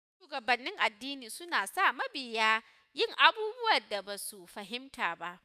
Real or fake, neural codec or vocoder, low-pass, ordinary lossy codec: fake; autoencoder, 48 kHz, 128 numbers a frame, DAC-VAE, trained on Japanese speech; 14.4 kHz; none